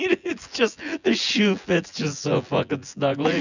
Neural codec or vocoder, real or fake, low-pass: vocoder, 24 kHz, 100 mel bands, Vocos; fake; 7.2 kHz